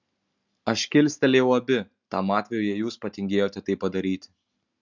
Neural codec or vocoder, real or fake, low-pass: none; real; 7.2 kHz